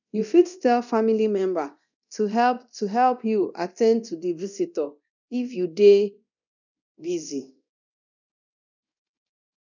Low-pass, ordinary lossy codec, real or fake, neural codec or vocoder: 7.2 kHz; none; fake; codec, 24 kHz, 0.9 kbps, DualCodec